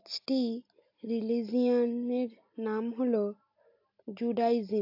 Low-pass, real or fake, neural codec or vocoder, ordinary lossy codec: 5.4 kHz; real; none; AAC, 32 kbps